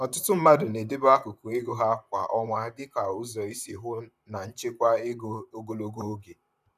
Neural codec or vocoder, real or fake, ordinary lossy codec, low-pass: vocoder, 44.1 kHz, 128 mel bands, Pupu-Vocoder; fake; none; 14.4 kHz